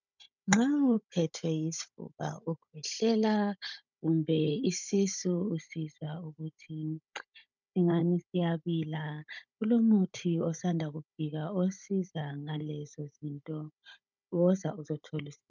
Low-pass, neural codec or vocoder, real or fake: 7.2 kHz; codec, 16 kHz, 16 kbps, FunCodec, trained on Chinese and English, 50 frames a second; fake